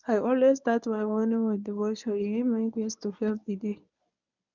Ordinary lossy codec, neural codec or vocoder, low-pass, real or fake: none; codec, 24 kHz, 0.9 kbps, WavTokenizer, medium speech release version 1; 7.2 kHz; fake